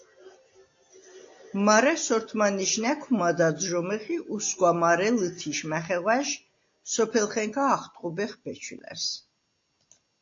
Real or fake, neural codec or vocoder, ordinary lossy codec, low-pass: real; none; AAC, 48 kbps; 7.2 kHz